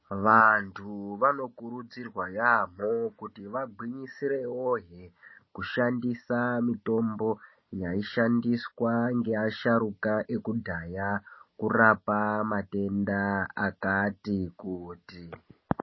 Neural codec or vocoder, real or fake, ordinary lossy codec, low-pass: none; real; MP3, 24 kbps; 7.2 kHz